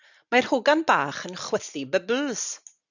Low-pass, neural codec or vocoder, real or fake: 7.2 kHz; none; real